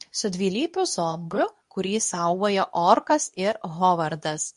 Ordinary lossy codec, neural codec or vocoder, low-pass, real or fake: MP3, 48 kbps; codec, 24 kHz, 0.9 kbps, WavTokenizer, medium speech release version 2; 10.8 kHz; fake